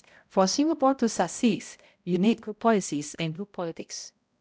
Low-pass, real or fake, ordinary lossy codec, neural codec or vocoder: none; fake; none; codec, 16 kHz, 0.5 kbps, X-Codec, HuBERT features, trained on balanced general audio